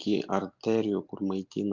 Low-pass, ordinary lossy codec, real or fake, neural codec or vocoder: 7.2 kHz; MP3, 64 kbps; real; none